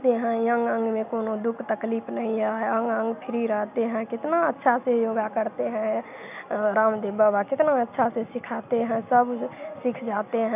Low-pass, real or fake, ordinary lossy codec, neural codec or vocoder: 3.6 kHz; real; none; none